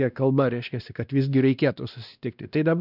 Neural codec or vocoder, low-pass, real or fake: codec, 16 kHz, 1 kbps, X-Codec, WavLM features, trained on Multilingual LibriSpeech; 5.4 kHz; fake